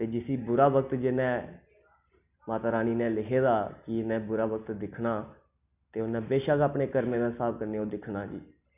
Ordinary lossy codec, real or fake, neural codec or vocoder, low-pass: none; real; none; 3.6 kHz